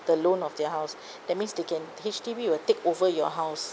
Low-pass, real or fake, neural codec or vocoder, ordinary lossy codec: none; real; none; none